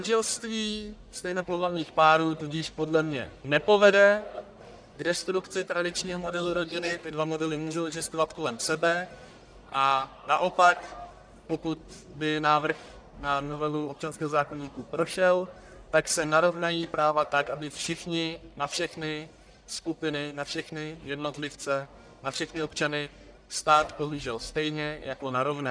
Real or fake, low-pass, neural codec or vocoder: fake; 9.9 kHz; codec, 44.1 kHz, 1.7 kbps, Pupu-Codec